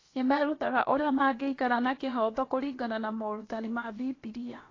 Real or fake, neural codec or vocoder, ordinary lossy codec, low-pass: fake; codec, 16 kHz, about 1 kbps, DyCAST, with the encoder's durations; AAC, 32 kbps; 7.2 kHz